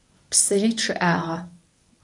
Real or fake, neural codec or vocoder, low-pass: fake; codec, 24 kHz, 0.9 kbps, WavTokenizer, medium speech release version 1; 10.8 kHz